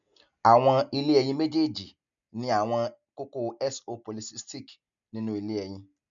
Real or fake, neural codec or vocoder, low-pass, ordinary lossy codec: real; none; 7.2 kHz; none